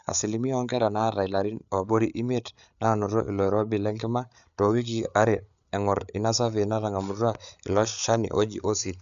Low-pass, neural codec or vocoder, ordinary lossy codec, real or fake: 7.2 kHz; codec, 16 kHz, 8 kbps, FreqCodec, larger model; none; fake